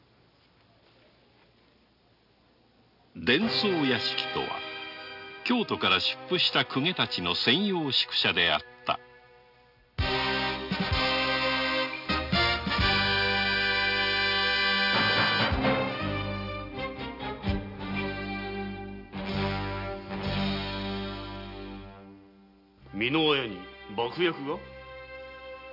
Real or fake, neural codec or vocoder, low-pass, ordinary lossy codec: real; none; 5.4 kHz; none